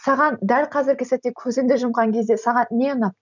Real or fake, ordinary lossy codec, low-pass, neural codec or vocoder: real; none; 7.2 kHz; none